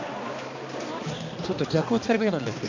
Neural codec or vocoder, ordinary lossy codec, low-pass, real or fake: codec, 16 kHz, 2 kbps, X-Codec, HuBERT features, trained on balanced general audio; MP3, 64 kbps; 7.2 kHz; fake